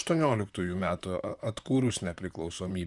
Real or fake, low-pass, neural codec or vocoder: fake; 10.8 kHz; vocoder, 44.1 kHz, 128 mel bands, Pupu-Vocoder